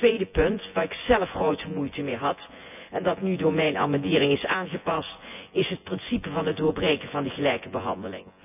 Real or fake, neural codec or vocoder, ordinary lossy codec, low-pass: fake; vocoder, 24 kHz, 100 mel bands, Vocos; none; 3.6 kHz